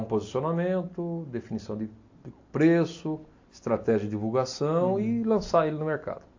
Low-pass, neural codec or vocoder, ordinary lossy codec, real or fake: 7.2 kHz; none; AAC, 48 kbps; real